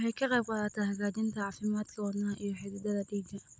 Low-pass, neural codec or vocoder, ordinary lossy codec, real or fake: none; none; none; real